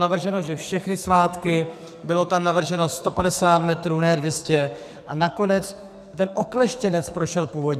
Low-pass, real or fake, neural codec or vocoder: 14.4 kHz; fake; codec, 44.1 kHz, 2.6 kbps, SNAC